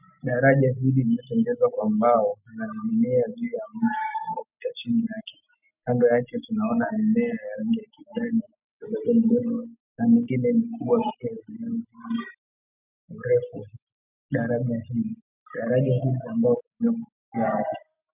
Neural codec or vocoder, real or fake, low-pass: none; real; 3.6 kHz